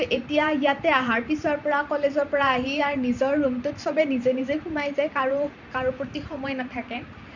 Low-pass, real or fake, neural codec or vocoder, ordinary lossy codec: 7.2 kHz; fake; vocoder, 44.1 kHz, 128 mel bands every 512 samples, BigVGAN v2; none